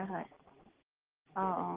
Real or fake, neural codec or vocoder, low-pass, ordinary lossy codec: real; none; 3.6 kHz; Opus, 16 kbps